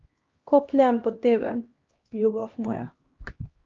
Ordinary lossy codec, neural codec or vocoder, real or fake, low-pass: Opus, 24 kbps; codec, 16 kHz, 1 kbps, X-Codec, HuBERT features, trained on LibriSpeech; fake; 7.2 kHz